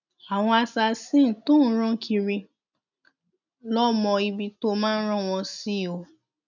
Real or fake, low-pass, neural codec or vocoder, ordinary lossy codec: real; 7.2 kHz; none; none